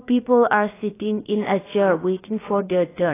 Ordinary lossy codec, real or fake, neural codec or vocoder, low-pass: AAC, 16 kbps; fake; codec, 16 kHz, 0.7 kbps, FocalCodec; 3.6 kHz